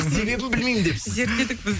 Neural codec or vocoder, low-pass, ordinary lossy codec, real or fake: none; none; none; real